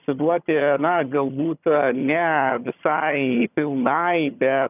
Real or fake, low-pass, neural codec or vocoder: fake; 3.6 kHz; vocoder, 22.05 kHz, 80 mel bands, HiFi-GAN